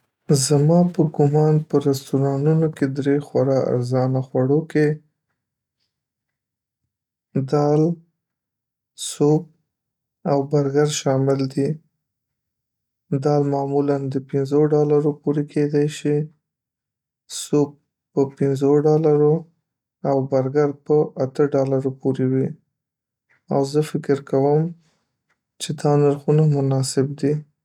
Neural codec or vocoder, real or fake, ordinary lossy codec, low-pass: none; real; none; 19.8 kHz